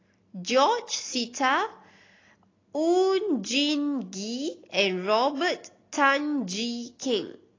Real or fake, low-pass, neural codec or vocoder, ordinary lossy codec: real; 7.2 kHz; none; AAC, 32 kbps